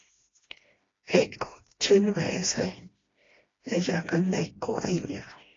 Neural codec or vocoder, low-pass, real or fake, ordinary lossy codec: codec, 16 kHz, 1 kbps, FreqCodec, smaller model; 7.2 kHz; fake; AAC, 32 kbps